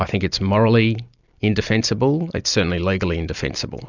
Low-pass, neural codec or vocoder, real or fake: 7.2 kHz; none; real